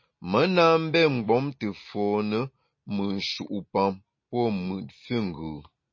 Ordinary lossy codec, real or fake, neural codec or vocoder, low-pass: MP3, 32 kbps; real; none; 7.2 kHz